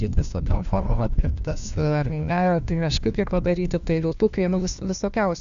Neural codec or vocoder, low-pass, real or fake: codec, 16 kHz, 1 kbps, FunCodec, trained on LibriTTS, 50 frames a second; 7.2 kHz; fake